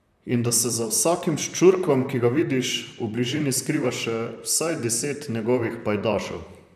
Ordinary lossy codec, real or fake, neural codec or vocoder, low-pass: none; fake; vocoder, 44.1 kHz, 128 mel bands, Pupu-Vocoder; 14.4 kHz